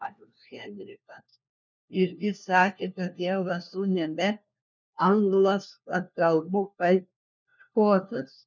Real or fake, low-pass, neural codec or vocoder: fake; 7.2 kHz; codec, 16 kHz, 1 kbps, FunCodec, trained on LibriTTS, 50 frames a second